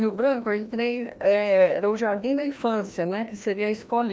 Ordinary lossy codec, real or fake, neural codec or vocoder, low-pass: none; fake; codec, 16 kHz, 1 kbps, FreqCodec, larger model; none